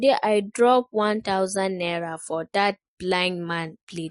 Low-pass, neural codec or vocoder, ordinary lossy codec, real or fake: 10.8 kHz; none; MP3, 48 kbps; real